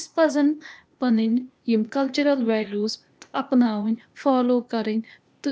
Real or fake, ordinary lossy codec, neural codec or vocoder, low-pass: fake; none; codec, 16 kHz, 0.8 kbps, ZipCodec; none